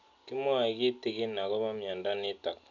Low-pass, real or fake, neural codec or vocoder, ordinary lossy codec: 7.2 kHz; real; none; none